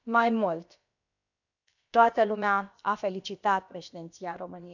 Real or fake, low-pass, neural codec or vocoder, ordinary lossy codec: fake; 7.2 kHz; codec, 16 kHz, about 1 kbps, DyCAST, with the encoder's durations; none